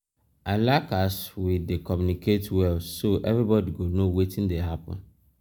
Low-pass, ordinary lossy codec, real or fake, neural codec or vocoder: none; none; real; none